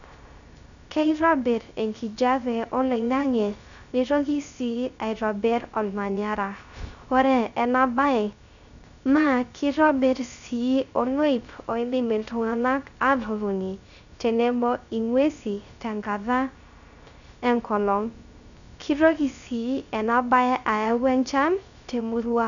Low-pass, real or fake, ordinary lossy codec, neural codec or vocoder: 7.2 kHz; fake; none; codec, 16 kHz, 0.3 kbps, FocalCodec